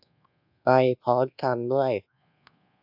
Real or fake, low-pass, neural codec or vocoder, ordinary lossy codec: fake; 5.4 kHz; codec, 24 kHz, 1.2 kbps, DualCodec; AAC, 48 kbps